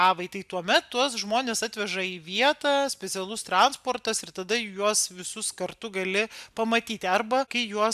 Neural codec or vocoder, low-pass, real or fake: none; 14.4 kHz; real